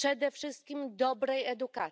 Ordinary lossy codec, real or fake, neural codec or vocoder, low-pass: none; real; none; none